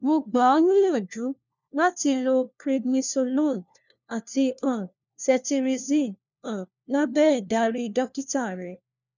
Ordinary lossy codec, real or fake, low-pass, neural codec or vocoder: none; fake; 7.2 kHz; codec, 16 kHz, 1 kbps, FunCodec, trained on LibriTTS, 50 frames a second